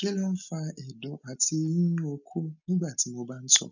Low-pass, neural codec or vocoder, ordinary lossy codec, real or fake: 7.2 kHz; none; none; real